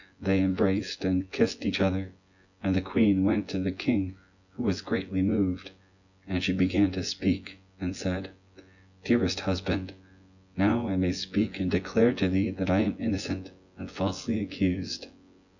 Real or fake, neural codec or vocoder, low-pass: fake; vocoder, 24 kHz, 100 mel bands, Vocos; 7.2 kHz